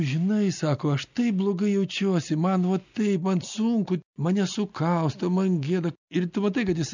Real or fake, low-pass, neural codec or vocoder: real; 7.2 kHz; none